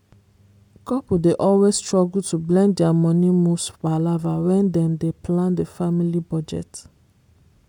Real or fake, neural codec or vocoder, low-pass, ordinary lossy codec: real; none; 19.8 kHz; MP3, 96 kbps